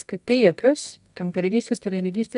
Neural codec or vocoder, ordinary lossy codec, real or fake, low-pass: codec, 24 kHz, 0.9 kbps, WavTokenizer, medium music audio release; AAC, 96 kbps; fake; 10.8 kHz